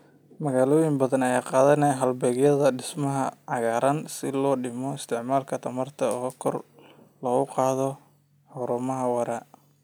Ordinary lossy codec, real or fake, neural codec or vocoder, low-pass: none; real; none; none